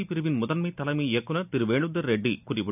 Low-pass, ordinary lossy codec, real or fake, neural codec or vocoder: 3.6 kHz; none; real; none